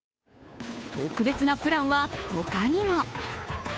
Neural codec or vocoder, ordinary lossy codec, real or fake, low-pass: codec, 16 kHz, 2 kbps, FunCodec, trained on Chinese and English, 25 frames a second; none; fake; none